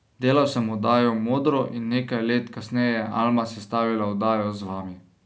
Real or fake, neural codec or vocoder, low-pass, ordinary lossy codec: real; none; none; none